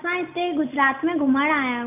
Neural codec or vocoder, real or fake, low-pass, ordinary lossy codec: none; real; 3.6 kHz; none